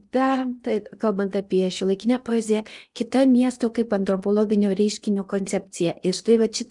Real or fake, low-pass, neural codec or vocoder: fake; 10.8 kHz; codec, 16 kHz in and 24 kHz out, 0.8 kbps, FocalCodec, streaming, 65536 codes